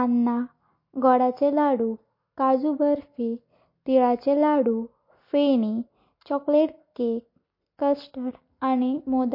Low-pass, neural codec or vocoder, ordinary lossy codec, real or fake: 5.4 kHz; none; MP3, 32 kbps; real